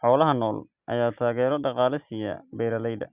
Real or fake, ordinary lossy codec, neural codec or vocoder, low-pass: real; Opus, 64 kbps; none; 3.6 kHz